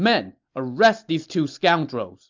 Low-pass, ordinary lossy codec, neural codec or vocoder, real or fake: 7.2 kHz; MP3, 64 kbps; none; real